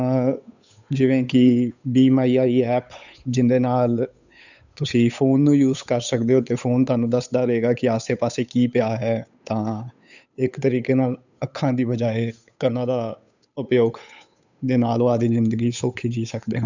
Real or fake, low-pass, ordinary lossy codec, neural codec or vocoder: fake; 7.2 kHz; none; codec, 16 kHz, 8 kbps, FunCodec, trained on Chinese and English, 25 frames a second